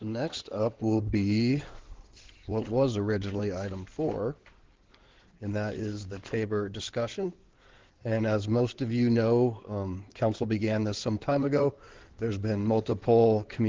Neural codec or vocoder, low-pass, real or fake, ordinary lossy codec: codec, 16 kHz in and 24 kHz out, 2.2 kbps, FireRedTTS-2 codec; 7.2 kHz; fake; Opus, 16 kbps